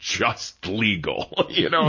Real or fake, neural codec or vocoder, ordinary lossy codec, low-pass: real; none; MP3, 32 kbps; 7.2 kHz